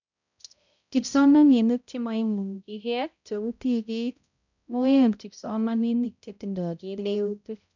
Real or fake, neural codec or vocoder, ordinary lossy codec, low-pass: fake; codec, 16 kHz, 0.5 kbps, X-Codec, HuBERT features, trained on balanced general audio; none; 7.2 kHz